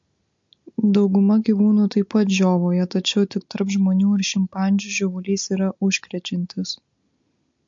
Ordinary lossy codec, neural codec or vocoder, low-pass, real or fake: MP3, 48 kbps; none; 7.2 kHz; real